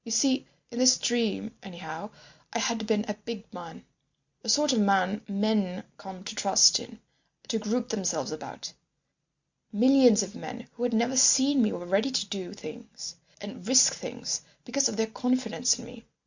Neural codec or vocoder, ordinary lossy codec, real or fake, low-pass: none; Opus, 64 kbps; real; 7.2 kHz